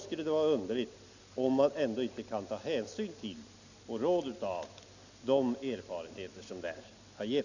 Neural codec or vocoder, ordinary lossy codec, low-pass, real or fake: none; none; 7.2 kHz; real